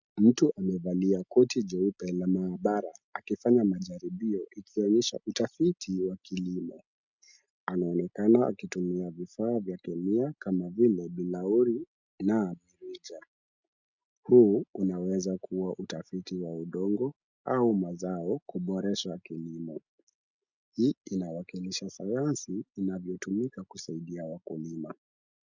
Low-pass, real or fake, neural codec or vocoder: 7.2 kHz; real; none